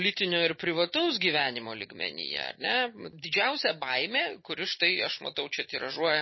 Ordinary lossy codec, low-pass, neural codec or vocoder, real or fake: MP3, 24 kbps; 7.2 kHz; none; real